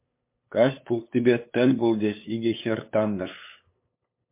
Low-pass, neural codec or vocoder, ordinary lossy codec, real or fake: 3.6 kHz; codec, 16 kHz, 8 kbps, FunCodec, trained on LibriTTS, 25 frames a second; MP3, 24 kbps; fake